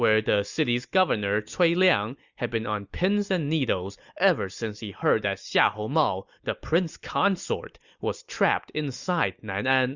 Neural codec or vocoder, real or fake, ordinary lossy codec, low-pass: none; real; Opus, 64 kbps; 7.2 kHz